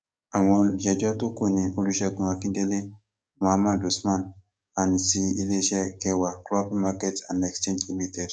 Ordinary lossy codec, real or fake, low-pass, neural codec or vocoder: none; fake; 9.9 kHz; codec, 44.1 kHz, 7.8 kbps, DAC